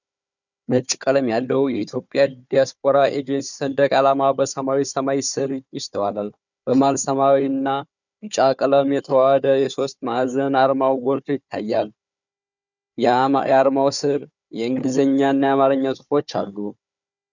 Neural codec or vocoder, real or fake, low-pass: codec, 16 kHz, 4 kbps, FunCodec, trained on Chinese and English, 50 frames a second; fake; 7.2 kHz